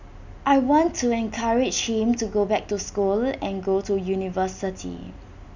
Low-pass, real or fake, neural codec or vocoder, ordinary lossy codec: 7.2 kHz; real; none; none